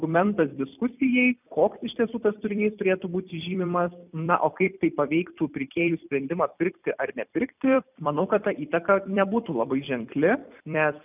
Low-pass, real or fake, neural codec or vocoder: 3.6 kHz; fake; vocoder, 44.1 kHz, 128 mel bands every 256 samples, BigVGAN v2